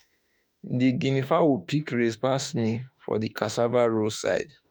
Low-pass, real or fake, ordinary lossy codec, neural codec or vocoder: none; fake; none; autoencoder, 48 kHz, 32 numbers a frame, DAC-VAE, trained on Japanese speech